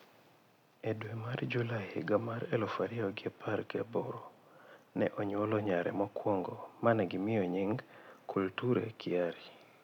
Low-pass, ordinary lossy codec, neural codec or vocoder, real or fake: 19.8 kHz; none; none; real